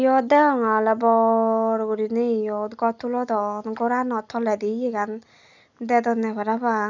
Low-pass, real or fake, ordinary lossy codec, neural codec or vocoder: 7.2 kHz; real; none; none